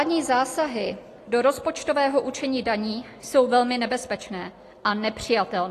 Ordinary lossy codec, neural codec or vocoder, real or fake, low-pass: AAC, 48 kbps; none; real; 14.4 kHz